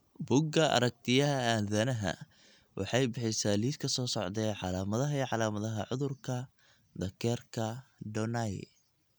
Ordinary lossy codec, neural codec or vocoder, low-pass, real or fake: none; none; none; real